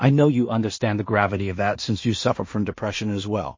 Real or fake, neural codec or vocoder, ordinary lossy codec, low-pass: fake; codec, 16 kHz in and 24 kHz out, 0.4 kbps, LongCat-Audio-Codec, two codebook decoder; MP3, 32 kbps; 7.2 kHz